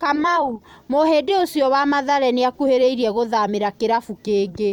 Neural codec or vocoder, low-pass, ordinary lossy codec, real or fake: vocoder, 44.1 kHz, 128 mel bands every 256 samples, BigVGAN v2; 19.8 kHz; MP3, 96 kbps; fake